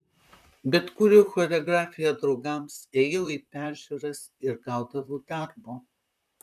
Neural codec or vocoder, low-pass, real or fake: vocoder, 44.1 kHz, 128 mel bands, Pupu-Vocoder; 14.4 kHz; fake